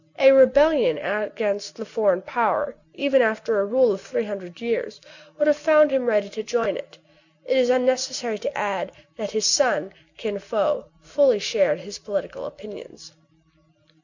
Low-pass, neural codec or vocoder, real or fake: 7.2 kHz; none; real